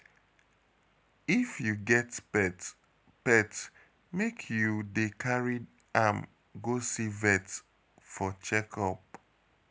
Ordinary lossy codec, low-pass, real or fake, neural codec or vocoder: none; none; real; none